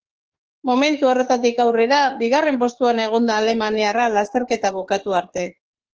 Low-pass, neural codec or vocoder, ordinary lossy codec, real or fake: 7.2 kHz; autoencoder, 48 kHz, 32 numbers a frame, DAC-VAE, trained on Japanese speech; Opus, 16 kbps; fake